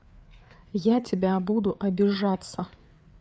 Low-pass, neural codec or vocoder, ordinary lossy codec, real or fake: none; codec, 16 kHz, 4 kbps, FreqCodec, larger model; none; fake